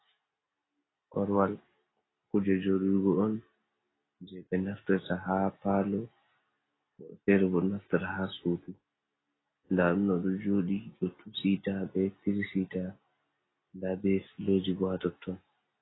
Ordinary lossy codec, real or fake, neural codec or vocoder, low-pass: AAC, 16 kbps; real; none; 7.2 kHz